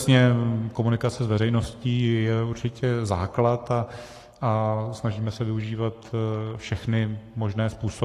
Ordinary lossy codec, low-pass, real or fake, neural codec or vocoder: AAC, 48 kbps; 14.4 kHz; fake; codec, 44.1 kHz, 7.8 kbps, Pupu-Codec